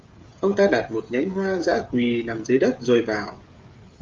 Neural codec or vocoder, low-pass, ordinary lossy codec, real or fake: codec, 16 kHz, 16 kbps, FreqCodec, smaller model; 7.2 kHz; Opus, 32 kbps; fake